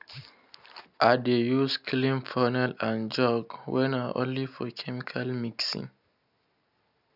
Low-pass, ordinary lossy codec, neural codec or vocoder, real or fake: 5.4 kHz; none; none; real